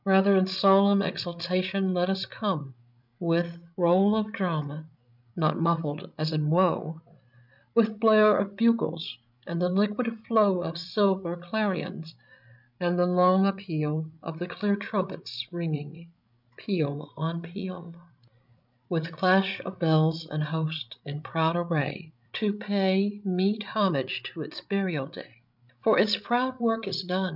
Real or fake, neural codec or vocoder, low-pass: fake; codec, 16 kHz, 16 kbps, FreqCodec, larger model; 5.4 kHz